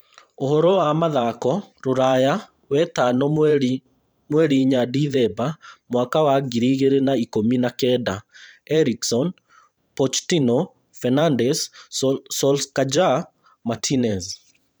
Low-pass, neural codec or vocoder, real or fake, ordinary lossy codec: none; vocoder, 44.1 kHz, 128 mel bands every 512 samples, BigVGAN v2; fake; none